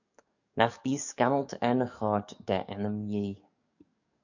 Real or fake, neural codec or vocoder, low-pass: fake; codec, 44.1 kHz, 7.8 kbps, DAC; 7.2 kHz